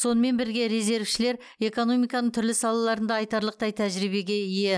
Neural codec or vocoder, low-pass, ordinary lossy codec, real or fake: none; none; none; real